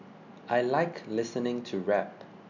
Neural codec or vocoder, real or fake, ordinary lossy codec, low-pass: none; real; none; 7.2 kHz